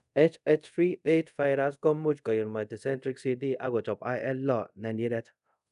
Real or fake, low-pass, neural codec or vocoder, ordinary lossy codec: fake; 10.8 kHz; codec, 24 kHz, 0.5 kbps, DualCodec; none